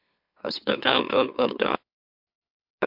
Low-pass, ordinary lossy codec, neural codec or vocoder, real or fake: 5.4 kHz; MP3, 48 kbps; autoencoder, 44.1 kHz, a latent of 192 numbers a frame, MeloTTS; fake